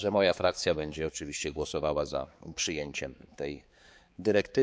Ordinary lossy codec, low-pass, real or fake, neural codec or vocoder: none; none; fake; codec, 16 kHz, 4 kbps, X-Codec, HuBERT features, trained on balanced general audio